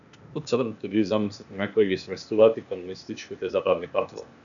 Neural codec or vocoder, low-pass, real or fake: codec, 16 kHz, 0.8 kbps, ZipCodec; 7.2 kHz; fake